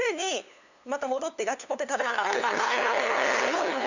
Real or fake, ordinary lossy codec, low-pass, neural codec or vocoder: fake; none; 7.2 kHz; codec, 16 kHz, 2 kbps, FunCodec, trained on LibriTTS, 25 frames a second